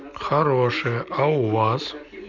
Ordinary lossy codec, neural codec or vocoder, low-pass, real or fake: none; none; 7.2 kHz; real